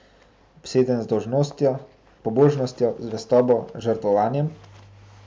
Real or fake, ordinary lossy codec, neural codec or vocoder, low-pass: real; none; none; none